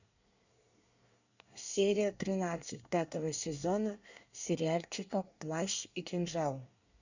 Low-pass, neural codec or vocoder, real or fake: 7.2 kHz; codec, 24 kHz, 1 kbps, SNAC; fake